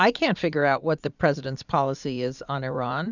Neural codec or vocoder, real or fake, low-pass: vocoder, 44.1 kHz, 80 mel bands, Vocos; fake; 7.2 kHz